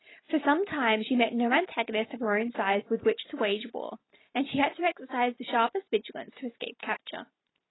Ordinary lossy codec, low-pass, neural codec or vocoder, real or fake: AAC, 16 kbps; 7.2 kHz; none; real